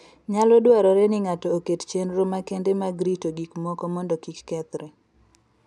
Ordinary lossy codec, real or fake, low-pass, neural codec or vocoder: none; real; none; none